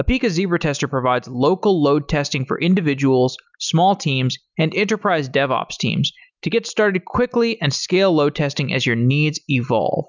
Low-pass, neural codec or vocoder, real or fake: 7.2 kHz; none; real